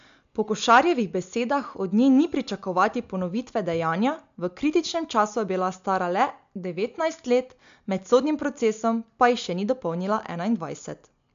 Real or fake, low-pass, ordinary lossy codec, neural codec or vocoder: real; 7.2 kHz; MP3, 64 kbps; none